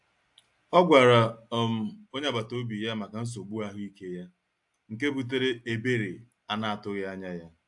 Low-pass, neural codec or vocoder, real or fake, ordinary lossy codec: 10.8 kHz; none; real; AAC, 64 kbps